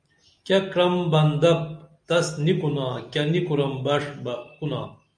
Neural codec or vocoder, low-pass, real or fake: none; 9.9 kHz; real